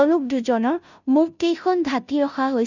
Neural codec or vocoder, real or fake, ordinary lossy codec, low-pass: codec, 16 kHz, 0.5 kbps, FunCodec, trained on Chinese and English, 25 frames a second; fake; none; 7.2 kHz